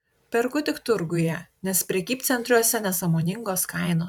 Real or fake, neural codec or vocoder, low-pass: fake; vocoder, 44.1 kHz, 128 mel bands every 512 samples, BigVGAN v2; 19.8 kHz